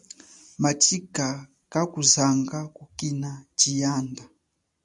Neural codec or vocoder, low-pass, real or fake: none; 10.8 kHz; real